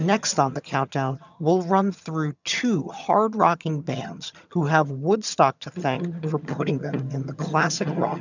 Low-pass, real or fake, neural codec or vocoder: 7.2 kHz; fake; vocoder, 22.05 kHz, 80 mel bands, HiFi-GAN